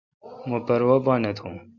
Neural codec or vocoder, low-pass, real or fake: none; 7.2 kHz; real